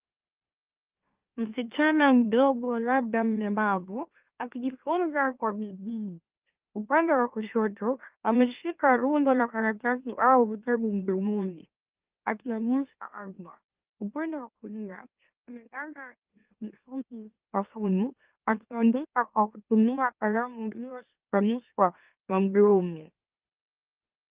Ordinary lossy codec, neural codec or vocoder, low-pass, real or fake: Opus, 24 kbps; autoencoder, 44.1 kHz, a latent of 192 numbers a frame, MeloTTS; 3.6 kHz; fake